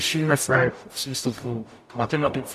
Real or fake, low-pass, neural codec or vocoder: fake; 14.4 kHz; codec, 44.1 kHz, 0.9 kbps, DAC